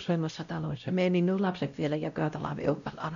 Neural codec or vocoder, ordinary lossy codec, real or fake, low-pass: codec, 16 kHz, 0.5 kbps, X-Codec, WavLM features, trained on Multilingual LibriSpeech; none; fake; 7.2 kHz